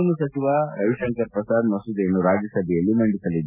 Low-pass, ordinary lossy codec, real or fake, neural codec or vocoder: 3.6 kHz; none; real; none